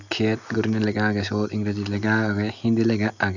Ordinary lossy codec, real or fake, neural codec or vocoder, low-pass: none; real; none; 7.2 kHz